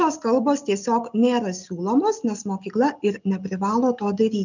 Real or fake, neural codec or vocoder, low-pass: real; none; 7.2 kHz